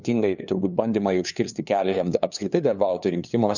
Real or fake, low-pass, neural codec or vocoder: fake; 7.2 kHz; codec, 16 kHz, 2 kbps, FunCodec, trained on LibriTTS, 25 frames a second